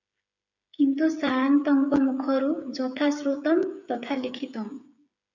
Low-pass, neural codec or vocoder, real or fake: 7.2 kHz; codec, 16 kHz, 8 kbps, FreqCodec, smaller model; fake